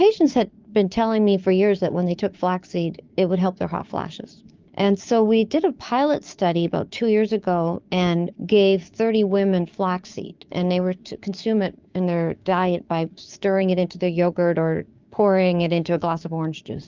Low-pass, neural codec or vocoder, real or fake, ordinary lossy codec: 7.2 kHz; codec, 16 kHz, 6 kbps, DAC; fake; Opus, 24 kbps